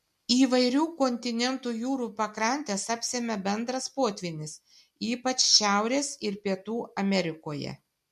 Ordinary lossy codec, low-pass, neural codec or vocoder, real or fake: MP3, 64 kbps; 14.4 kHz; none; real